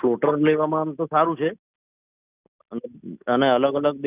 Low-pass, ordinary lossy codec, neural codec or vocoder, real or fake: 3.6 kHz; none; none; real